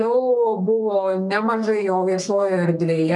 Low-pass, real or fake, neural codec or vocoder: 10.8 kHz; fake; codec, 32 kHz, 1.9 kbps, SNAC